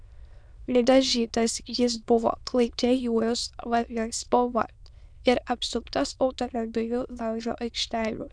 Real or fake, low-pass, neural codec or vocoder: fake; 9.9 kHz; autoencoder, 22.05 kHz, a latent of 192 numbers a frame, VITS, trained on many speakers